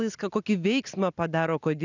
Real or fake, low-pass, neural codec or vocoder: real; 7.2 kHz; none